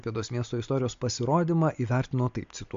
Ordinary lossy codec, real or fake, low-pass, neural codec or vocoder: MP3, 48 kbps; real; 7.2 kHz; none